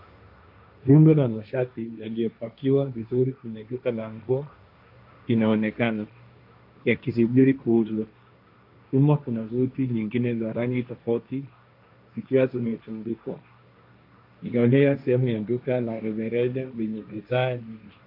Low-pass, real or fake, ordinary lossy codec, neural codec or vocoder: 5.4 kHz; fake; AAC, 48 kbps; codec, 16 kHz, 1.1 kbps, Voila-Tokenizer